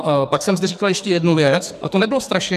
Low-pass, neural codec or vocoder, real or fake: 14.4 kHz; codec, 44.1 kHz, 2.6 kbps, SNAC; fake